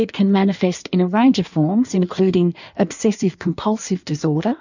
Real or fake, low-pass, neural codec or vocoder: fake; 7.2 kHz; codec, 16 kHz in and 24 kHz out, 1.1 kbps, FireRedTTS-2 codec